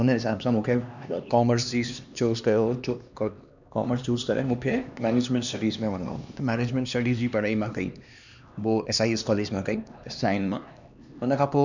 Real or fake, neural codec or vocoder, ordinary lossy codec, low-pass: fake; codec, 16 kHz, 2 kbps, X-Codec, HuBERT features, trained on LibriSpeech; none; 7.2 kHz